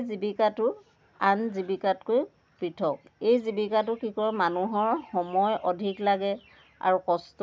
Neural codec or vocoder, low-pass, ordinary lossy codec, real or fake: none; none; none; real